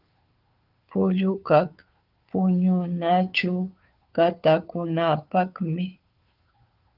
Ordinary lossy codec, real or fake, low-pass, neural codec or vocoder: Opus, 32 kbps; fake; 5.4 kHz; codec, 16 kHz, 2 kbps, FunCodec, trained on Chinese and English, 25 frames a second